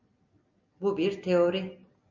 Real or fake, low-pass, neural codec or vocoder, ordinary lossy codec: real; 7.2 kHz; none; Opus, 64 kbps